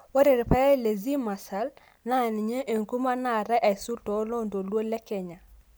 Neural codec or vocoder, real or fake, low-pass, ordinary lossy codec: none; real; none; none